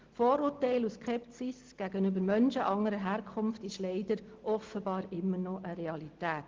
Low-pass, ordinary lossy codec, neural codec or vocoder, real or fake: 7.2 kHz; Opus, 16 kbps; none; real